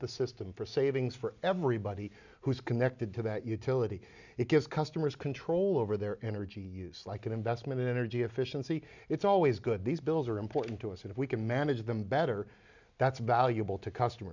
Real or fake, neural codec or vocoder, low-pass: real; none; 7.2 kHz